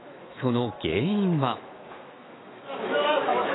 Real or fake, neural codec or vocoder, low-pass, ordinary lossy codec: fake; autoencoder, 48 kHz, 128 numbers a frame, DAC-VAE, trained on Japanese speech; 7.2 kHz; AAC, 16 kbps